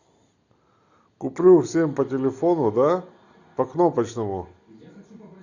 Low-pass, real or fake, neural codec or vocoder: 7.2 kHz; real; none